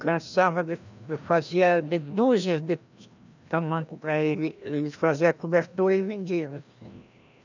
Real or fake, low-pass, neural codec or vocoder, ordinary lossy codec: fake; 7.2 kHz; codec, 16 kHz, 1 kbps, FreqCodec, larger model; none